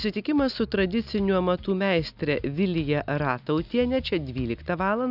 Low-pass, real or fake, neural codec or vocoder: 5.4 kHz; real; none